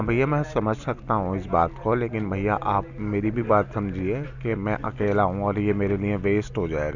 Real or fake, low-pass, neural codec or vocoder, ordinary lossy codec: real; 7.2 kHz; none; none